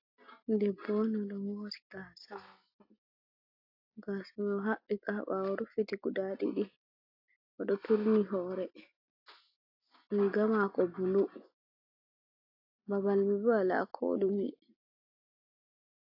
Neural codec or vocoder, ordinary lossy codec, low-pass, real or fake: none; AAC, 32 kbps; 5.4 kHz; real